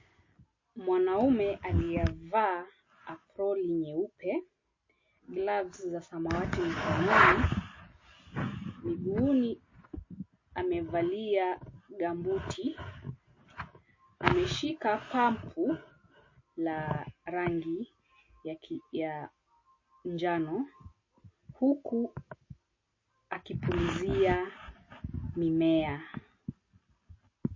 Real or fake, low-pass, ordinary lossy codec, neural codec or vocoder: real; 7.2 kHz; MP3, 32 kbps; none